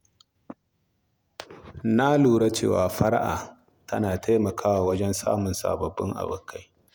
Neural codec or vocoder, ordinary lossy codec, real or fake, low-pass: vocoder, 48 kHz, 128 mel bands, Vocos; none; fake; none